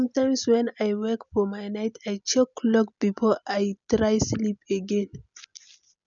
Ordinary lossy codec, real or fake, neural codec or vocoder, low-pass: none; real; none; 7.2 kHz